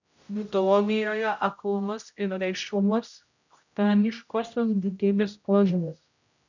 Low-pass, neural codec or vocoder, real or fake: 7.2 kHz; codec, 16 kHz, 0.5 kbps, X-Codec, HuBERT features, trained on general audio; fake